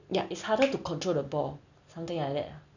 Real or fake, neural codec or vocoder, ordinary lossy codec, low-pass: real; none; none; 7.2 kHz